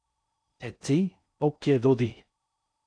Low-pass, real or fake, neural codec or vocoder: 9.9 kHz; fake; codec, 16 kHz in and 24 kHz out, 0.6 kbps, FocalCodec, streaming, 4096 codes